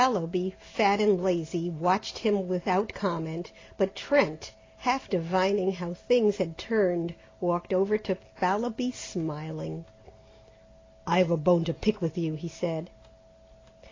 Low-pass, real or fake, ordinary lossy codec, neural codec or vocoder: 7.2 kHz; real; AAC, 32 kbps; none